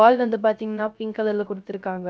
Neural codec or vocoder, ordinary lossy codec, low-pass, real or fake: codec, 16 kHz, 0.3 kbps, FocalCodec; none; none; fake